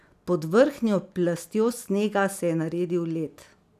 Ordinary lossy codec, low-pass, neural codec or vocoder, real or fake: none; 14.4 kHz; none; real